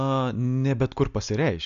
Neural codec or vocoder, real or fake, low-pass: none; real; 7.2 kHz